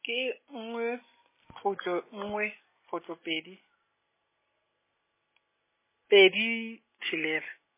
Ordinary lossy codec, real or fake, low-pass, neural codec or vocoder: MP3, 16 kbps; real; 3.6 kHz; none